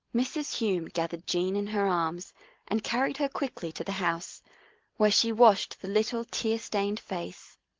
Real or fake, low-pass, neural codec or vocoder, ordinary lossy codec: real; 7.2 kHz; none; Opus, 16 kbps